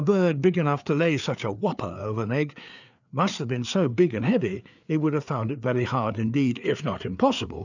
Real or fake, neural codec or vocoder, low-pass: fake; codec, 16 kHz, 4 kbps, FreqCodec, larger model; 7.2 kHz